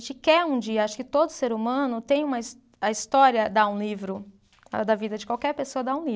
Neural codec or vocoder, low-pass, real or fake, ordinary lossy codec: none; none; real; none